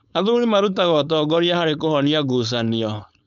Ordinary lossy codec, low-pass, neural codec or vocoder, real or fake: none; 7.2 kHz; codec, 16 kHz, 4.8 kbps, FACodec; fake